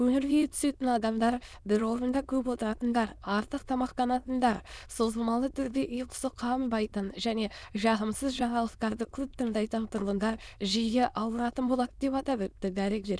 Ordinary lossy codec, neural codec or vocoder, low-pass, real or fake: none; autoencoder, 22.05 kHz, a latent of 192 numbers a frame, VITS, trained on many speakers; none; fake